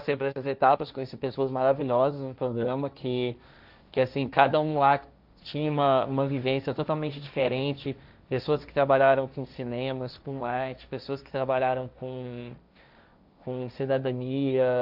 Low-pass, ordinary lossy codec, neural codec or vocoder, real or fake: 5.4 kHz; none; codec, 16 kHz, 1.1 kbps, Voila-Tokenizer; fake